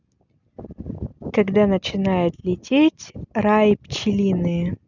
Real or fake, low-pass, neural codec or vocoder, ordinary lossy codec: real; 7.2 kHz; none; none